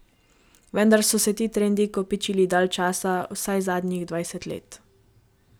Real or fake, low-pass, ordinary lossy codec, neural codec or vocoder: real; none; none; none